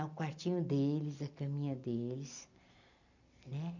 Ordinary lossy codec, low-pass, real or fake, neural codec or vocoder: none; 7.2 kHz; real; none